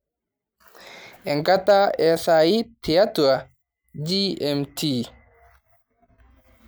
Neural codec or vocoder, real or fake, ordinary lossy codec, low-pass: none; real; none; none